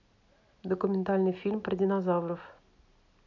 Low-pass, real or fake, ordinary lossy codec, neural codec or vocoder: 7.2 kHz; real; none; none